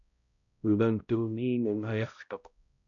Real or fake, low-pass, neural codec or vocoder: fake; 7.2 kHz; codec, 16 kHz, 0.5 kbps, X-Codec, HuBERT features, trained on balanced general audio